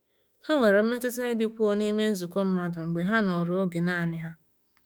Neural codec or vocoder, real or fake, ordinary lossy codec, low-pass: autoencoder, 48 kHz, 32 numbers a frame, DAC-VAE, trained on Japanese speech; fake; none; none